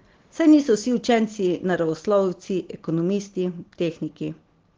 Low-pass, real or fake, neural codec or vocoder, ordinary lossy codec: 7.2 kHz; real; none; Opus, 16 kbps